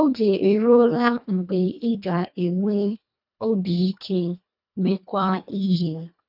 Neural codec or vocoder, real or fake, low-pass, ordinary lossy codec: codec, 24 kHz, 1.5 kbps, HILCodec; fake; 5.4 kHz; none